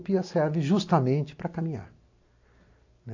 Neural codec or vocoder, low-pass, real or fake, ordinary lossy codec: none; 7.2 kHz; real; AAC, 48 kbps